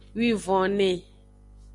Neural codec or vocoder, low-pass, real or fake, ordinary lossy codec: none; 10.8 kHz; real; MP3, 48 kbps